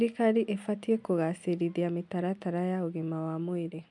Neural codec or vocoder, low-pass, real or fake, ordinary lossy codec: none; 10.8 kHz; real; MP3, 64 kbps